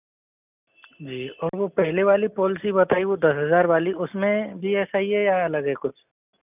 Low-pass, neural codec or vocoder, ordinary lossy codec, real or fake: 3.6 kHz; none; none; real